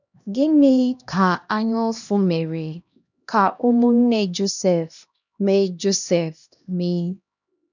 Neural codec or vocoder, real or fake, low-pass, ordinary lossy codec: codec, 16 kHz, 1 kbps, X-Codec, HuBERT features, trained on LibriSpeech; fake; 7.2 kHz; none